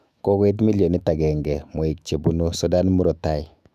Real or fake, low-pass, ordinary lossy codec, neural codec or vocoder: fake; 14.4 kHz; none; autoencoder, 48 kHz, 128 numbers a frame, DAC-VAE, trained on Japanese speech